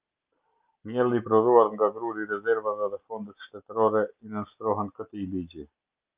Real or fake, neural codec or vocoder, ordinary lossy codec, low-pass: real; none; Opus, 24 kbps; 3.6 kHz